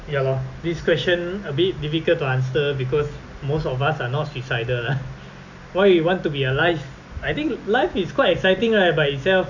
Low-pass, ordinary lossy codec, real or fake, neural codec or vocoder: 7.2 kHz; none; real; none